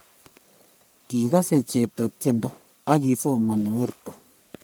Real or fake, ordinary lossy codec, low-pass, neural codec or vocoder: fake; none; none; codec, 44.1 kHz, 1.7 kbps, Pupu-Codec